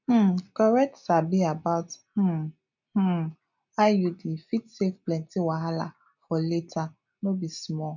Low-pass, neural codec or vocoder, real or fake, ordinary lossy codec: 7.2 kHz; none; real; none